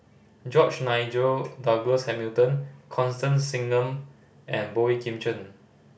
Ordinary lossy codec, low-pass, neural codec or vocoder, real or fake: none; none; none; real